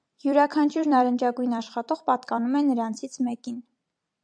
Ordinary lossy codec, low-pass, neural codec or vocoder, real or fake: AAC, 64 kbps; 9.9 kHz; vocoder, 44.1 kHz, 128 mel bands every 256 samples, BigVGAN v2; fake